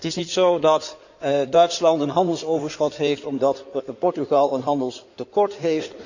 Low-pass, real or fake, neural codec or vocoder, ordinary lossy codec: 7.2 kHz; fake; codec, 16 kHz in and 24 kHz out, 2.2 kbps, FireRedTTS-2 codec; none